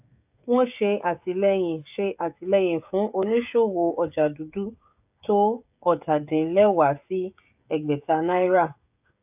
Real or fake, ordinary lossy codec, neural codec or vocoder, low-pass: fake; AAC, 32 kbps; codec, 16 kHz, 16 kbps, FreqCodec, smaller model; 3.6 kHz